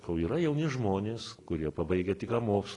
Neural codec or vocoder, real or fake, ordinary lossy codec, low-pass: none; real; AAC, 32 kbps; 10.8 kHz